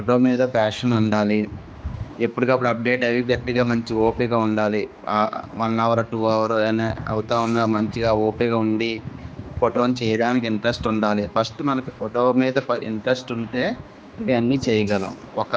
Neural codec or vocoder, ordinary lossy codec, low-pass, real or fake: codec, 16 kHz, 2 kbps, X-Codec, HuBERT features, trained on general audio; none; none; fake